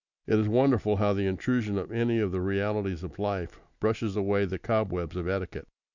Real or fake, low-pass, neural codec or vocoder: real; 7.2 kHz; none